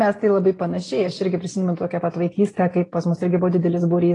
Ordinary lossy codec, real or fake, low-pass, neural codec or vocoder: AAC, 32 kbps; real; 10.8 kHz; none